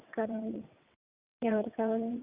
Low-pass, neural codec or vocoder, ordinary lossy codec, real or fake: 3.6 kHz; vocoder, 22.05 kHz, 80 mel bands, WaveNeXt; Opus, 64 kbps; fake